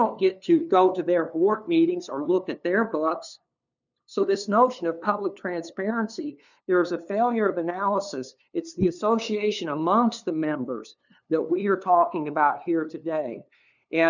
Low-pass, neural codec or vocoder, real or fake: 7.2 kHz; codec, 16 kHz, 2 kbps, FunCodec, trained on LibriTTS, 25 frames a second; fake